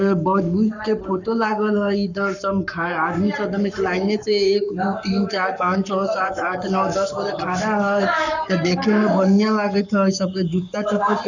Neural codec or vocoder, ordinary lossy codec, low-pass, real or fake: codec, 44.1 kHz, 7.8 kbps, Pupu-Codec; none; 7.2 kHz; fake